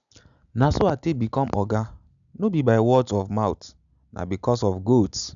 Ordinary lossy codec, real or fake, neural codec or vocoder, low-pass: none; real; none; 7.2 kHz